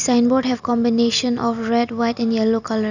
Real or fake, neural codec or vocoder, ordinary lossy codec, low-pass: real; none; none; 7.2 kHz